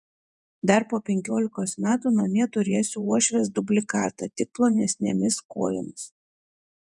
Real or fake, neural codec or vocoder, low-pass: fake; vocoder, 48 kHz, 128 mel bands, Vocos; 10.8 kHz